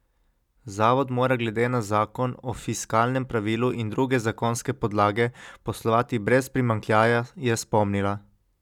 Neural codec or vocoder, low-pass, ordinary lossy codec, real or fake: none; 19.8 kHz; none; real